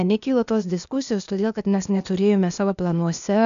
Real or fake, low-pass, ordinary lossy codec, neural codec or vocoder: fake; 7.2 kHz; AAC, 96 kbps; codec, 16 kHz, 0.8 kbps, ZipCodec